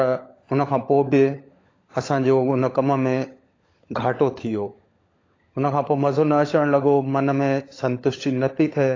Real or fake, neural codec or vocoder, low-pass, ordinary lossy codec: fake; codec, 16 kHz, 16 kbps, FunCodec, trained on LibriTTS, 50 frames a second; 7.2 kHz; AAC, 32 kbps